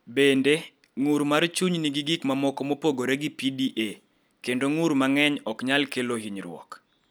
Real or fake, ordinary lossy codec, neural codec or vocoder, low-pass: real; none; none; none